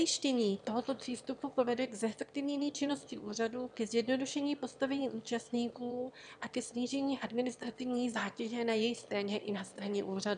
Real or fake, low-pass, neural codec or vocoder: fake; 9.9 kHz; autoencoder, 22.05 kHz, a latent of 192 numbers a frame, VITS, trained on one speaker